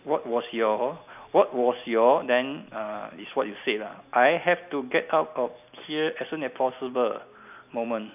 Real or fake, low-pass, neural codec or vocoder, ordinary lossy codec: real; 3.6 kHz; none; none